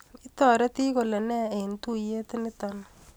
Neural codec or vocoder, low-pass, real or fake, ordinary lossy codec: none; none; real; none